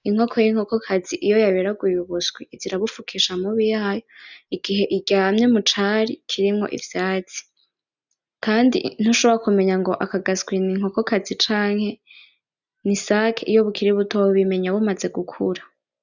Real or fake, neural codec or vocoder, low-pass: real; none; 7.2 kHz